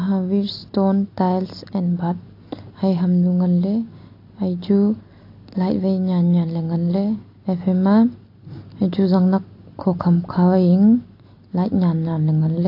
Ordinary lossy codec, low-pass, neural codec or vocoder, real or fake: AAC, 32 kbps; 5.4 kHz; none; real